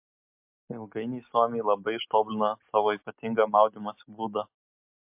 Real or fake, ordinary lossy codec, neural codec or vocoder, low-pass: real; MP3, 32 kbps; none; 3.6 kHz